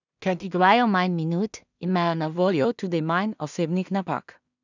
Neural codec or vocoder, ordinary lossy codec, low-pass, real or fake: codec, 16 kHz in and 24 kHz out, 0.4 kbps, LongCat-Audio-Codec, two codebook decoder; none; 7.2 kHz; fake